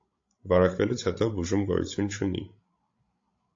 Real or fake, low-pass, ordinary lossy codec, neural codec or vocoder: fake; 7.2 kHz; AAC, 48 kbps; codec, 16 kHz, 16 kbps, FreqCodec, larger model